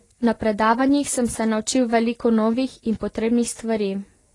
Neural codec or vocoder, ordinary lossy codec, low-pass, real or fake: none; AAC, 32 kbps; 10.8 kHz; real